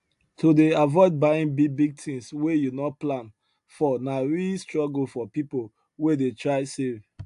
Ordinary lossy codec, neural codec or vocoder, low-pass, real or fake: AAC, 64 kbps; none; 10.8 kHz; real